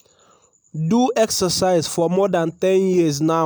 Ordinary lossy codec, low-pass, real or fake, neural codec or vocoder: none; none; real; none